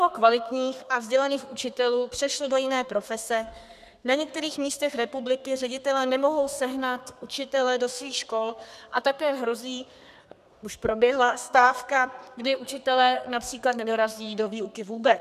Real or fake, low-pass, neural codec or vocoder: fake; 14.4 kHz; codec, 32 kHz, 1.9 kbps, SNAC